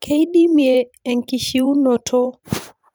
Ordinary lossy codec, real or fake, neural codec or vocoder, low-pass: none; fake; vocoder, 44.1 kHz, 128 mel bands every 512 samples, BigVGAN v2; none